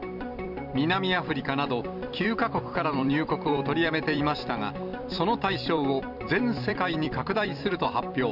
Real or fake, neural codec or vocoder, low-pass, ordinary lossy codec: fake; vocoder, 44.1 kHz, 128 mel bands every 256 samples, BigVGAN v2; 5.4 kHz; none